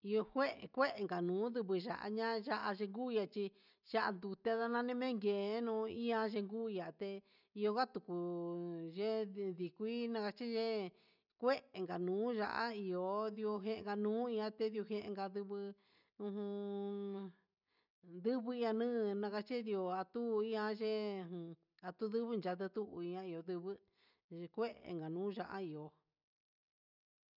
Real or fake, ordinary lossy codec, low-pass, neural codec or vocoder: real; none; 5.4 kHz; none